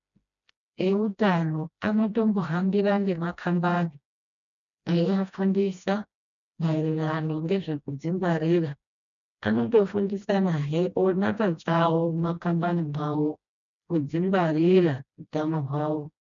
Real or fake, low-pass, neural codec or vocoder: fake; 7.2 kHz; codec, 16 kHz, 1 kbps, FreqCodec, smaller model